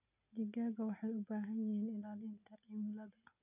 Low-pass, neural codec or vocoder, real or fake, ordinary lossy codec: 3.6 kHz; none; real; none